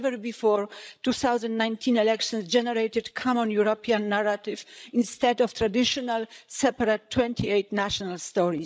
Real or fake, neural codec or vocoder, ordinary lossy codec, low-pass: fake; codec, 16 kHz, 16 kbps, FreqCodec, larger model; none; none